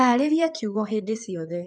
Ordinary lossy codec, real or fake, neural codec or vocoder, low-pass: none; fake; codec, 16 kHz in and 24 kHz out, 2.2 kbps, FireRedTTS-2 codec; 9.9 kHz